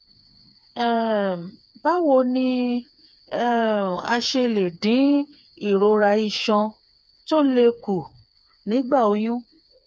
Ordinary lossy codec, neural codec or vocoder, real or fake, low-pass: none; codec, 16 kHz, 4 kbps, FreqCodec, smaller model; fake; none